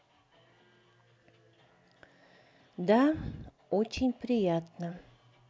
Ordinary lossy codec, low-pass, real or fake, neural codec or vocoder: none; none; real; none